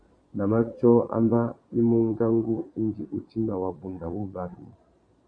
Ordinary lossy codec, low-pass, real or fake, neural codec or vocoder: AAC, 64 kbps; 9.9 kHz; fake; vocoder, 22.05 kHz, 80 mel bands, Vocos